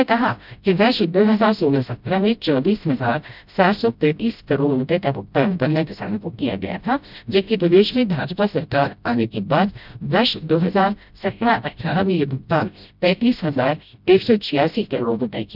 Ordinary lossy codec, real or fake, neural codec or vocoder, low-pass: none; fake; codec, 16 kHz, 0.5 kbps, FreqCodec, smaller model; 5.4 kHz